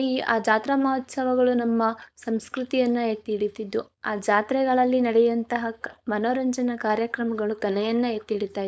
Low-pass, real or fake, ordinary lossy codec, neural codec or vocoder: none; fake; none; codec, 16 kHz, 4.8 kbps, FACodec